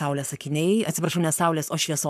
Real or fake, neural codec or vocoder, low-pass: fake; codec, 44.1 kHz, 7.8 kbps, Pupu-Codec; 14.4 kHz